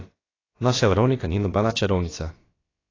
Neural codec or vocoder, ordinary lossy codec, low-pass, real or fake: codec, 16 kHz, about 1 kbps, DyCAST, with the encoder's durations; AAC, 32 kbps; 7.2 kHz; fake